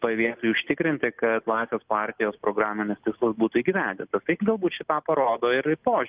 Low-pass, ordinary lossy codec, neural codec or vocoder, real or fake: 3.6 kHz; Opus, 32 kbps; none; real